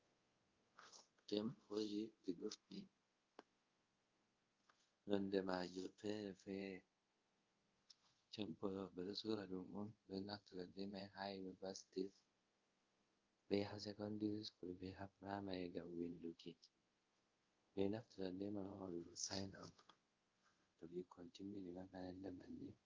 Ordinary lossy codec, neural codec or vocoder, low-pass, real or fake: Opus, 32 kbps; codec, 24 kHz, 0.5 kbps, DualCodec; 7.2 kHz; fake